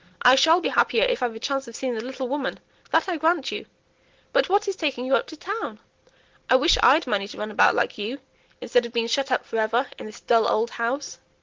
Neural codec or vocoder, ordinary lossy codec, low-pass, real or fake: none; Opus, 16 kbps; 7.2 kHz; real